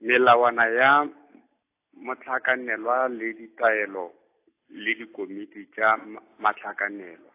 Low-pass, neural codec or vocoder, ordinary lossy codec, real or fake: 3.6 kHz; none; AAC, 24 kbps; real